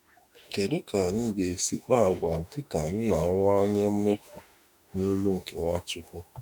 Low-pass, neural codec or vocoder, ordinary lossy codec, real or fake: none; autoencoder, 48 kHz, 32 numbers a frame, DAC-VAE, trained on Japanese speech; none; fake